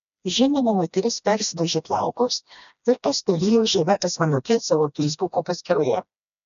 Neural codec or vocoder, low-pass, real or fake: codec, 16 kHz, 1 kbps, FreqCodec, smaller model; 7.2 kHz; fake